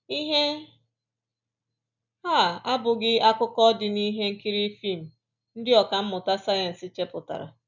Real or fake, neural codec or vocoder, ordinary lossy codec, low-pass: real; none; none; 7.2 kHz